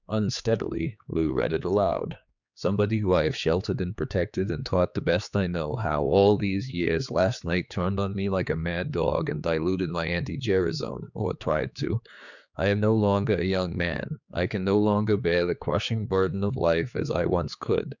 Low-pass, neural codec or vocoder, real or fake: 7.2 kHz; codec, 16 kHz, 4 kbps, X-Codec, HuBERT features, trained on general audio; fake